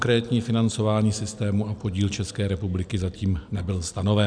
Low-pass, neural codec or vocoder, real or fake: 9.9 kHz; none; real